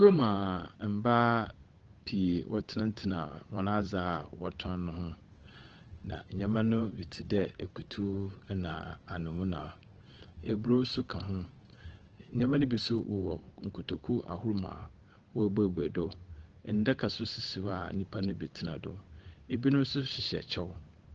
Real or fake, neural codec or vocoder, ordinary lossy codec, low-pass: fake; codec, 16 kHz, 8 kbps, FunCodec, trained on Chinese and English, 25 frames a second; Opus, 16 kbps; 7.2 kHz